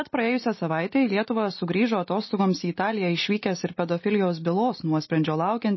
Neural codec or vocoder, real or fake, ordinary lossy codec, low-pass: none; real; MP3, 24 kbps; 7.2 kHz